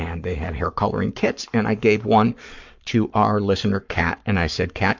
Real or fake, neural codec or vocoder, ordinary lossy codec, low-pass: fake; codec, 44.1 kHz, 7.8 kbps, Pupu-Codec; MP3, 64 kbps; 7.2 kHz